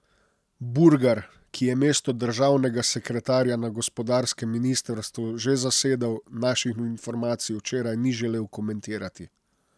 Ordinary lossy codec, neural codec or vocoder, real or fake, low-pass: none; none; real; none